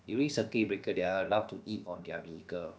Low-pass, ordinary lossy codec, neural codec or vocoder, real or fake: none; none; codec, 16 kHz, about 1 kbps, DyCAST, with the encoder's durations; fake